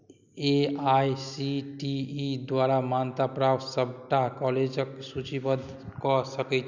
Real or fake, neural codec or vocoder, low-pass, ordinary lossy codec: real; none; 7.2 kHz; none